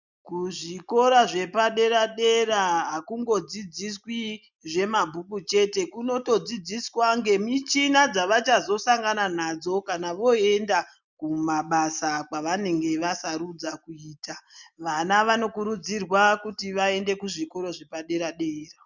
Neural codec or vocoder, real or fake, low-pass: none; real; 7.2 kHz